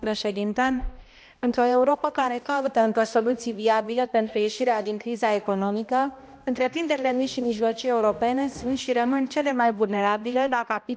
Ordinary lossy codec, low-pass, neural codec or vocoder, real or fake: none; none; codec, 16 kHz, 1 kbps, X-Codec, HuBERT features, trained on balanced general audio; fake